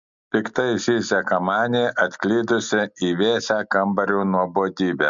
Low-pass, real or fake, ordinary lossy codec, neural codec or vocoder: 7.2 kHz; real; MP3, 64 kbps; none